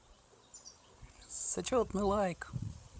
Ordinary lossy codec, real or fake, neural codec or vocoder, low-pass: none; fake; codec, 16 kHz, 16 kbps, FreqCodec, larger model; none